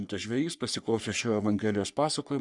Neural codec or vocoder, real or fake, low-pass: codec, 44.1 kHz, 3.4 kbps, Pupu-Codec; fake; 10.8 kHz